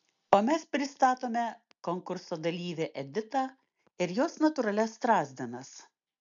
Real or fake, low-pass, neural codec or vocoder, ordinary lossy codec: real; 7.2 kHz; none; MP3, 96 kbps